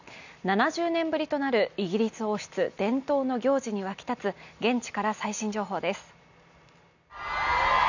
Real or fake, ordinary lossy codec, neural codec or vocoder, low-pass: real; none; none; 7.2 kHz